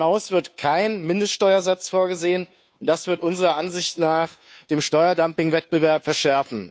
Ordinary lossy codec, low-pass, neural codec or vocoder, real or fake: none; none; codec, 16 kHz, 2 kbps, FunCodec, trained on Chinese and English, 25 frames a second; fake